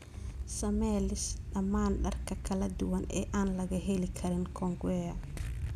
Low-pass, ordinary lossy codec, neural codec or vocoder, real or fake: 14.4 kHz; none; none; real